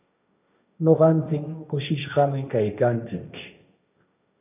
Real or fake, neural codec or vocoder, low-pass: fake; codec, 16 kHz, 1.1 kbps, Voila-Tokenizer; 3.6 kHz